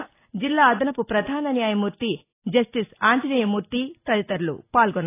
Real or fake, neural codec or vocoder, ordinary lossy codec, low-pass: real; none; AAC, 24 kbps; 3.6 kHz